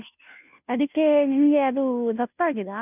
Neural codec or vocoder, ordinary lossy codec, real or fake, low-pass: codec, 16 kHz, 0.5 kbps, FunCodec, trained on Chinese and English, 25 frames a second; none; fake; 3.6 kHz